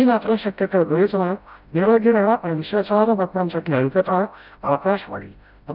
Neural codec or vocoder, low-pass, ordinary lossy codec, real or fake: codec, 16 kHz, 0.5 kbps, FreqCodec, smaller model; 5.4 kHz; none; fake